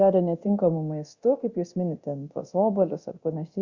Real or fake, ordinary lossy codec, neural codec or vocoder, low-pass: fake; Opus, 64 kbps; codec, 24 kHz, 0.9 kbps, DualCodec; 7.2 kHz